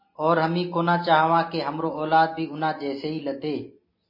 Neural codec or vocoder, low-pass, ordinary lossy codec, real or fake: none; 5.4 kHz; MP3, 24 kbps; real